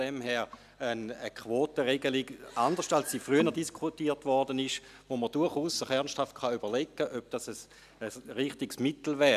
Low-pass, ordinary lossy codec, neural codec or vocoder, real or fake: 14.4 kHz; none; none; real